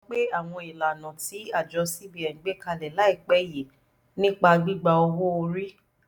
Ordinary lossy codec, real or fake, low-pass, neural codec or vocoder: none; real; none; none